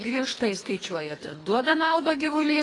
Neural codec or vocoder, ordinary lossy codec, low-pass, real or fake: codec, 24 kHz, 1.5 kbps, HILCodec; AAC, 32 kbps; 10.8 kHz; fake